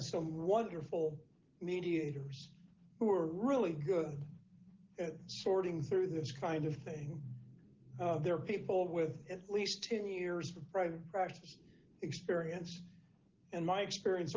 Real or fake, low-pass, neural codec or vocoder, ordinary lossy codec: real; 7.2 kHz; none; Opus, 16 kbps